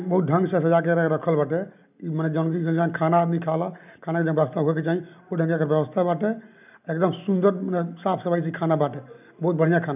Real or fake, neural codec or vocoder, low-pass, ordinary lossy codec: real; none; 3.6 kHz; none